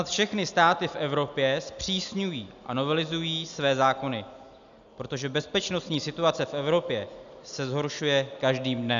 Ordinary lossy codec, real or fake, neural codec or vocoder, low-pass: MP3, 96 kbps; real; none; 7.2 kHz